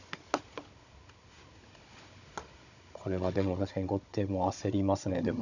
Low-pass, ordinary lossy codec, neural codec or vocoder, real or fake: 7.2 kHz; none; codec, 16 kHz, 16 kbps, FunCodec, trained on Chinese and English, 50 frames a second; fake